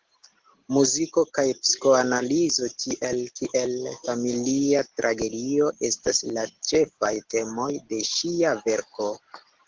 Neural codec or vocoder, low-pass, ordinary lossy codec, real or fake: none; 7.2 kHz; Opus, 16 kbps; real